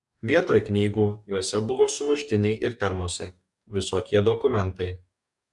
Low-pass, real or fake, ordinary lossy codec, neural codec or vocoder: 10.8 kHz; fake; MP3, 96 kbps; codec, 44.1 kHz, 2.6 kbps, DAC